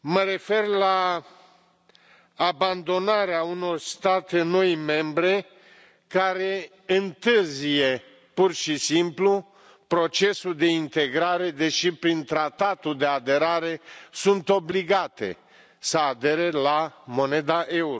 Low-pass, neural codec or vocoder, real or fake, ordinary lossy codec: none; none; real; none